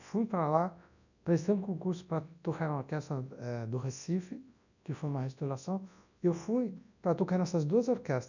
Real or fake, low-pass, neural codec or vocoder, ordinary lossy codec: fake; 7.2 kHz; codec, 24 kHz, 0.9 kbps, WavTokenizer, large speech release; none